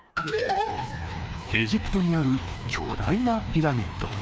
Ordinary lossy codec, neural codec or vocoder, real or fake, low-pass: none; codec, 16 kHz, 2 kbps, FreqCodec, larger model; fake; none